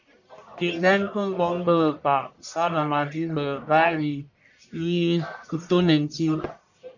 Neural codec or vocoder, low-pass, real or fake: codec, 44.1 kHz, 1.7 kbps, Pupu-Codec; 7.2 kHz; fake